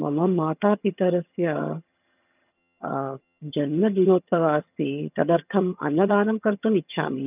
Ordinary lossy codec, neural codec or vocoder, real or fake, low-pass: none; vocoder, 22.05 kHz, 80 mel bands, HiFi-GAN; fake; 3.6 kHz